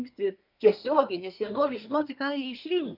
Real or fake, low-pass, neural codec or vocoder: fake; 5.4 kHz; codec, 24 kHz, 1 kbps, SNAC